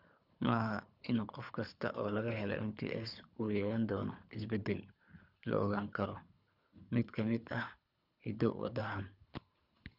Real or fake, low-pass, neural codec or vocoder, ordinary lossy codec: fake; 5.4 kHz; codec, 24 kHz, 3 kbps, HILCodec; none